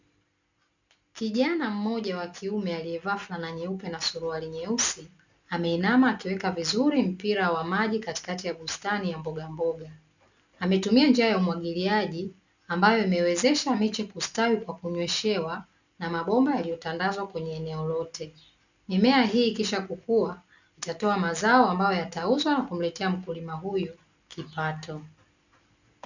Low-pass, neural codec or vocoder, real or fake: 7.2 kHz; none; real